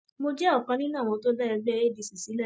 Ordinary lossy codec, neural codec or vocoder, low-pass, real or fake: none; none; none; real